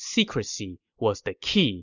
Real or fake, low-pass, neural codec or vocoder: real; 7.2 kHz; none